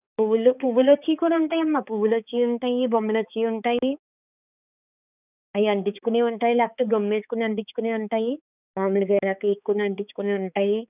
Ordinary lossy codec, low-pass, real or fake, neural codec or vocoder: none; 3.6 kHz; fake; codec, 16 kHz, 4 kbps, X-Codec, HuBERT features, trained on balanced general audio